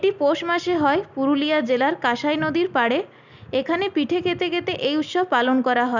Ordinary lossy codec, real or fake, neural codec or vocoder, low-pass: none; real; none; 7.2 kHz